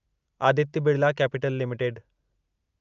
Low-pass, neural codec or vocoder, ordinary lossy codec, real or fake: 7.2 kHz; none; Opus, 24 kbps; real